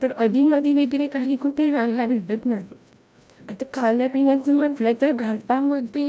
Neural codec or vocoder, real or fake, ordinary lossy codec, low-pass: codec, 16 kHz, 0.5 kbps, FreqCodec, larger model; fake; none; none